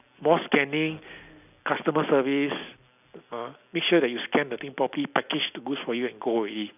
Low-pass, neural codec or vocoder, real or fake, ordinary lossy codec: 3.6 kHz; none; real; none